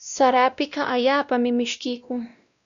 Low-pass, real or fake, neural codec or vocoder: 7.2 kHz; fake; codec, 16 kHz, 1 kbps, X-Codec, WavLM features, trained on Multilingual LibriSpeech